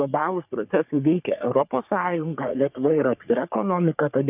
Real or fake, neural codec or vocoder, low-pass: fake; codec, 44.1 kHz, 3.4 kbps, Pupu-Codec; 3.6 kHz